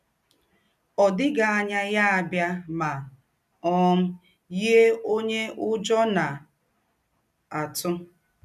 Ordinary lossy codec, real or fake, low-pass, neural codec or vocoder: none; real; 14.4 kHz; none